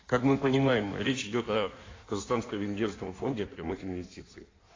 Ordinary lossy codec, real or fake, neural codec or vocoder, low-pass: AAC, 32 kbps; fake; codec, 16 kHz in and 24 kHz out, 1.1 kbps, FireRedTTS-2 codec; 7.2 kHz